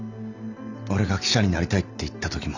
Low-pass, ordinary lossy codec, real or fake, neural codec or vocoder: 7.2 kHz; none; real; none